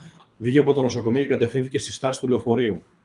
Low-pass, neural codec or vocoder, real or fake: 10.8 kHz; codec, 24 kHz, 3 kbps, HILCodec; fake